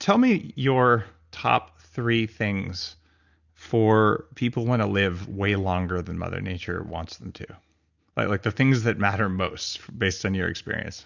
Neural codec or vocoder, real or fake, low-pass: none; real; 7.2 kHz